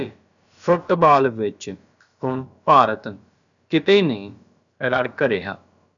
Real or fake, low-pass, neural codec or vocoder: fake; 7.2 kHz; codec, 16 kHz, about 1 kbps, DyCAST, with the encoder's durations